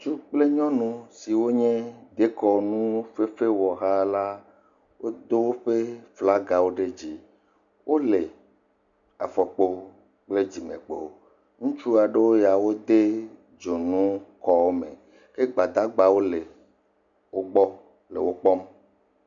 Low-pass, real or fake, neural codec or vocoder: 7.2 kHz; real; none